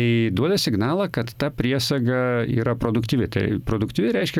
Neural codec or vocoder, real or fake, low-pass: vocoder, 44.1 kHz, 128 mel bands every 512 samples, BigVGAN v2; fake; 19.8 kHz